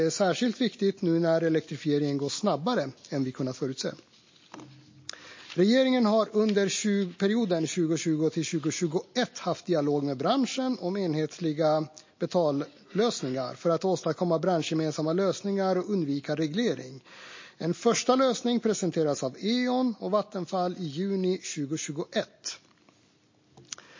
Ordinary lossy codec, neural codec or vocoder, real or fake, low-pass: MP3, 32 kbps; none; real; 7.2 kHz